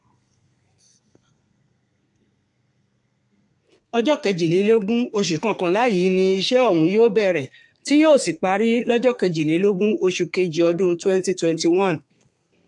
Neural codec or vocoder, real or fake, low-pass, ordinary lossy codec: codec, 32 kHz, 1.9 kbps, SNAC; fake; 10.8 kHz; AAC, 64 kbps